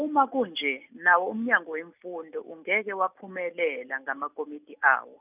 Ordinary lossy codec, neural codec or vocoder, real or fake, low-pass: none; none; real; 3.6 kHz